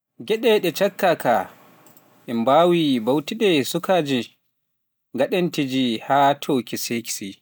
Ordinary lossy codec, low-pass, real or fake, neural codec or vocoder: none; none; real; none